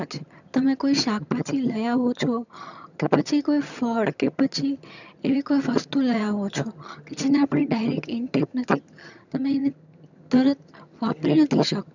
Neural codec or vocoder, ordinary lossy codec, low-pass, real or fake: vocoder, 22.05 kHz, 80 mel bands, HiFi-GAN; none; 7.2 kHz; fake